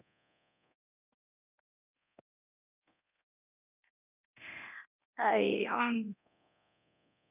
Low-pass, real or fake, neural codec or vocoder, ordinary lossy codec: 3.6 kHz; fake; codec, 24 kHz, 0.9 kbps, DualCodec; none